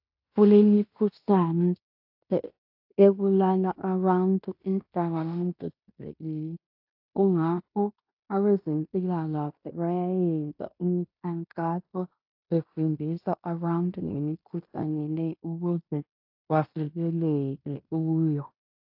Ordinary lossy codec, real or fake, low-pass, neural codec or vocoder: AAC, 48 kbps; fake; 5.4 kHz; codec, 16 kHz in and 24 kHz out, 0.9 kbps, LongCat-Audio-Codec, fine tuned four codebook decoder